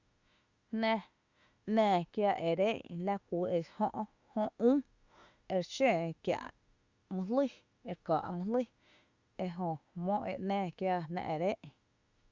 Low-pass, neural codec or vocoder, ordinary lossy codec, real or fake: 7.2 kHz; autoencoder, 48 kHz, 32 numbers a frame, DAC-VAE, trained on Japanese speech; Opus, 64 kbps; fake